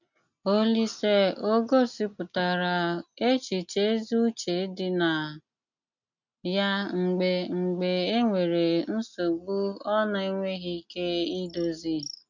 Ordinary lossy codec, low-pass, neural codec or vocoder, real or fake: none; 7.2 kHz; none; real